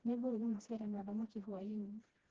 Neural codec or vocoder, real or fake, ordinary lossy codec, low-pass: codec, 16 kHz, 1 kbps, FreqCodec, smaller model; fake; Opus, 16 kbps; 7.2 kHz